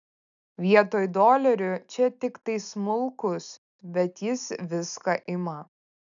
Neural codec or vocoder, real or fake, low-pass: none; real; 7.2 kHz